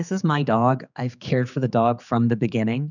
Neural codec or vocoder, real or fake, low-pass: codec, 16 kHz, 4 kbps, X-Codec, HuBERT features, trained on general audio; fake; 7.2 kHz